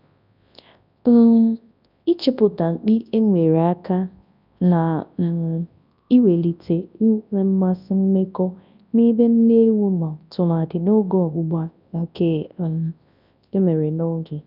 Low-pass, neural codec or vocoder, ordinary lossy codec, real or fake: 5.4 kHz; codec, 24 kHz, 0.9 kbps, WavTokenizer, large speech release; none; fake